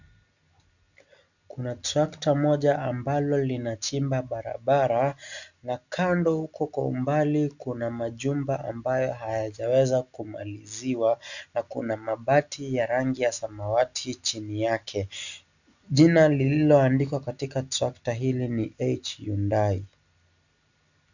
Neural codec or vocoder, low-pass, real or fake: none; 7.2 kHz; real